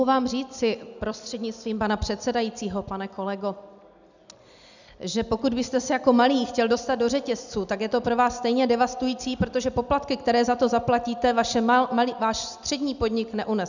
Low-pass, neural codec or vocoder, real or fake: 7.2 kHz; none; real